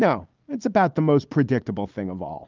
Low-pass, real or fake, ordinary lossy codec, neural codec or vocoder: 7.2 kHz; real; Opus, 24 kbps; none